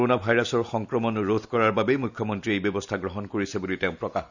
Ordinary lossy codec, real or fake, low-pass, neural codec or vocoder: none; real; 7.2 kHz; none